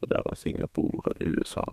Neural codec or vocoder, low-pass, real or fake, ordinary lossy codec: codec, 32 kHz, 1.9 kbps, SNAC; 14.4 kHz; fake; none